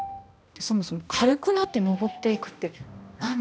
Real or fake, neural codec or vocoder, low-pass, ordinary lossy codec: fake; codec, 16 kHz, 1 kbps, X-Codec, HuBERT features, trained on balanced general audio; none; none